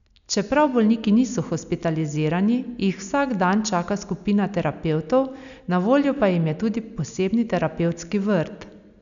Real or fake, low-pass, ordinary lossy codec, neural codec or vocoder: real; 7.2 kHz; none; none